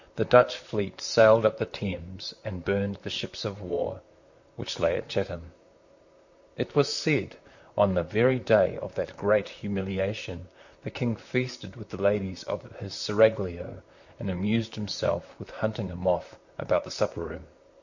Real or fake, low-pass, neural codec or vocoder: fake; 7.2 kHz; vocoder, 44.1 kHz, 128 mel bands, Pupu-Vocoder